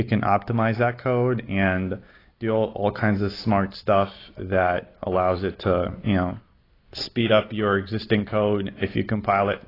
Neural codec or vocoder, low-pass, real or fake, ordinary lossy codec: none; 5.4 kHz; real; AAC, 24 kbps